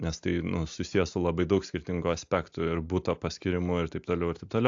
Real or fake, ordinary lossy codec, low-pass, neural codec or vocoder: real; AAC, 64 kbps; 7.2 kHz; none